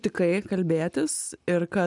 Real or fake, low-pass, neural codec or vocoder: real; 10.8 kHz; none